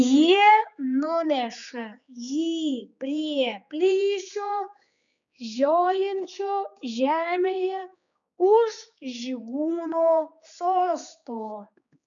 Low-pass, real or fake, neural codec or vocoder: 7.2 kHz; fake; codec, 16 kHz, 4 kbps, X-Codec, HuBERT features, trained on general audio